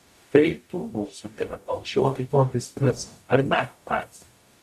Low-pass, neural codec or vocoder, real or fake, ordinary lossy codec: 14.4 kHz; codec, 44.1 kHz, 0.9 kbps, DAC; fake; MP3, 64 kbps